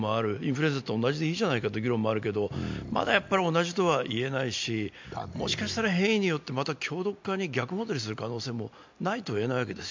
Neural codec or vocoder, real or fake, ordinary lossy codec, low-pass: none; real; MP3, 64 kbps; 7.2 kHz